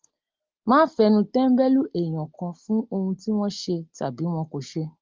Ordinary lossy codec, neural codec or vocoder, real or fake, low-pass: Opus, 32 kbps; none; real; 7.2 kHz